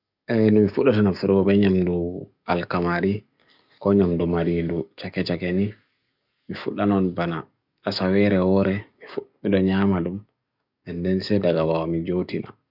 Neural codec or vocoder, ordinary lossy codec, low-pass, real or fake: codec, 44.1 kHz, 7.8 kbps, DAC; none; 5.4 kHz; fake